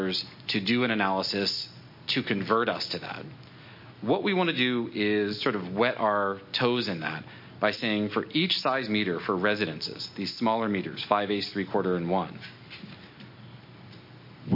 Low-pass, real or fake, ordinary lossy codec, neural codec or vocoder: 5.4 kHz; real; MP3, 32 kbps; none